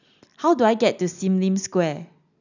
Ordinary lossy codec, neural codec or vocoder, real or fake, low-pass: none; none; real; 7.2 kHz